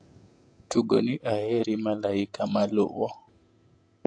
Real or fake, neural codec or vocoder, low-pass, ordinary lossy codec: fake; vocoder, 48 kHz, 128 mel bands, Vocos; 9.9 kHz; AAC, 48 kbps